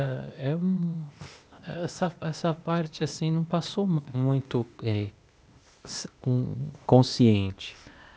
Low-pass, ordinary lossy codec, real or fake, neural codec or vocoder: none; none; fake; codec, 16 kHz, 0.8 kbps, ZipCodec